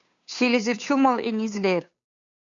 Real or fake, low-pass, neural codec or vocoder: fake; 7.2 kHz; codec, 16 kHz, 2 kbps, FunCodec, trained on Chinese and English, 25 frames a second